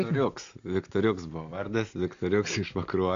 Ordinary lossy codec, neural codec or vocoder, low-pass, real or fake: AAC, 48 kbps; none; 7.2 kHz; real